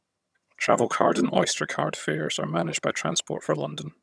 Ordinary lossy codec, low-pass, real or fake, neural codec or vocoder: none; none; fake; vocoder, 22.05 kHz, 80 mel bands, HiFi-GAN